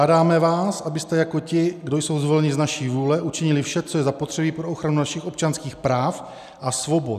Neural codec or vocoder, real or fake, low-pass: none; real; 14.4 kHz